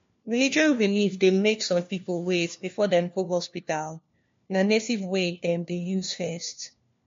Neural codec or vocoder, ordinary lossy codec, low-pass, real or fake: codec, 16 kHz, 1 kbps, FunCodec, trained on LibriTTS, 50 frames a second; MP3, 48 kbps; 7.2 kHz; fake